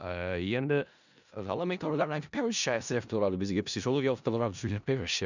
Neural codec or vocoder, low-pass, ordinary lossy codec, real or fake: codec, 16 kHz in and 24 kHz out, 0.4 kbps, LongCat-Audio-Codec, four codebook decoder; 7.2 kHz; none; fake